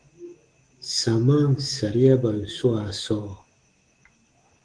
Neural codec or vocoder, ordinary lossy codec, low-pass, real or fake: autoencoder, 48 kHz, 128 numbers a frame, DAC-VAE, trained on Japanese speech; Opus, 16 kbps; 9.9 kHz; fake